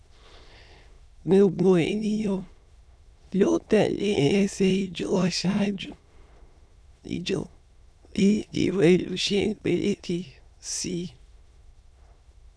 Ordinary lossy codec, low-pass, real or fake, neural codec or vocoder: none; none; fake; autoencoder, 22.05 kHz, a latent of 192 numbers a frame, VITS, trained on many speakers